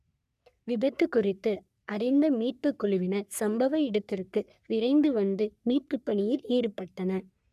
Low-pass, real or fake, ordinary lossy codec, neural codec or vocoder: 14.4 kHz; fake; none; codec, 44.1 kHz, 3.4 kbps, Pupu-Codec